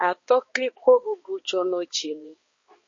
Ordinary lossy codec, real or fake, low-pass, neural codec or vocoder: MP3, 32 kbps; fake; 7.2 kHz; codec, 16 kHz, 2 kbps, X-Codec, HuBERT features, trained on balanced general audio